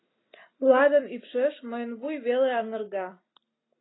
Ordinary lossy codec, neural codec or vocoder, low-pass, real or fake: AAC, 16 kbps; none; 7.2 kHz; real